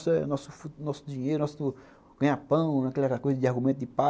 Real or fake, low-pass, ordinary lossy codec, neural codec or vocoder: real; none; none; none